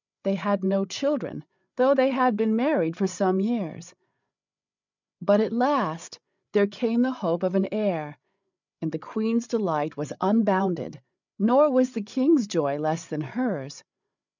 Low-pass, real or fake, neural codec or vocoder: 7.2 kHz; fake; codec, 16 kHz, 8 kbps, FreqCodec, larger model